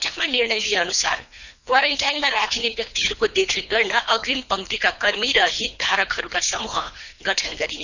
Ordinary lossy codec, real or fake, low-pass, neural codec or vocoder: none; fake; 7.2 kHz; codec, 24 kHz, 3 kbps, HILCodec